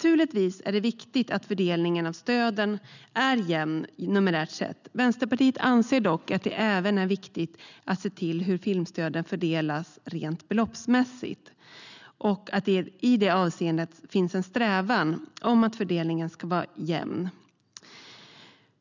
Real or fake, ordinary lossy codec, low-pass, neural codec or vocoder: real; none; 7.2 kHz; none